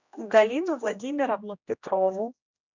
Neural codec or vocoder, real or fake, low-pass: codec, 16 kHz, 1 kbps, X-Codec, HuBERT features, trained on general audio; fake; 7.2 kHz